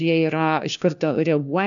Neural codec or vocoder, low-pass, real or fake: codec, 16 kHz, 1 kbps, FunCodec, trained on LibriTTS, 50 frames a second; 7.2 kHz; fake